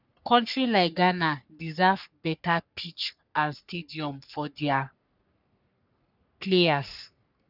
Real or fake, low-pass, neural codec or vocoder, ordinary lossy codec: fake; 5.4 kHz; codec, 44.1 kHz, 3.4 kbps, Pupu-Codec; none